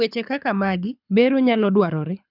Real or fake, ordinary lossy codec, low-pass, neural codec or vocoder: fake; none; 5.4 kHz; codec, 24 kHz, 6 kbps, HILCodec